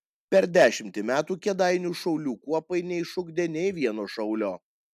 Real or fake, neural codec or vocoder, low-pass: real; none; 14.4 kHz